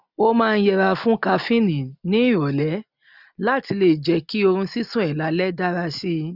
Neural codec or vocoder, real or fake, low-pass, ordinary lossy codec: none; real; 5.4 kHz; none